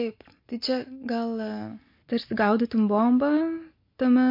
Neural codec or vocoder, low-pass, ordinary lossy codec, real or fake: none; 5.4 kHz; MP3, 32 kbps; real